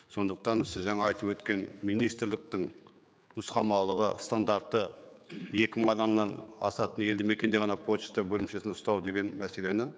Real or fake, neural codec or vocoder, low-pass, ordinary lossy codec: fake; codec, 16 kHz, 4 kbps, X-Codec, HuBERT features, trained on general audio; none; none